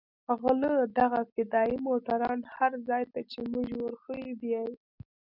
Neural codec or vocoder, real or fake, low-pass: none; real; 5.4 kHz